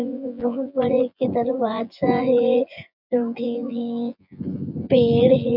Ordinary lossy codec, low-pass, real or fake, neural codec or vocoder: none; 5.4 kHz; fake; vocoder, 24 kHz, 100 mel bands, Vocos